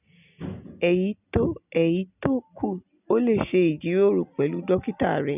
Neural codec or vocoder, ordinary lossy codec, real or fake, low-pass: none; none; real; 3.6 kHz